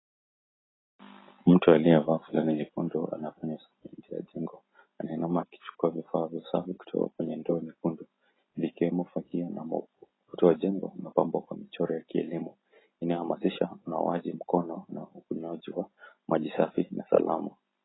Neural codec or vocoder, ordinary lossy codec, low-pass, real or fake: none; AAC, 16 kbps; 7.2 kHz; real